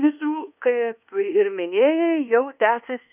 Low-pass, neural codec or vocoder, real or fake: 3.6 kHz; codec, 24 kHz, 1.2 kbps, DualCodec; fake